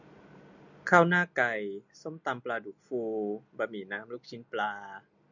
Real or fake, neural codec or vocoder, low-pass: real; none; 7.2 kHz